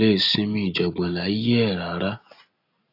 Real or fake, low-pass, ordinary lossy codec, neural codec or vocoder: real; 5.4 kHz; AAC, 32 kbps; none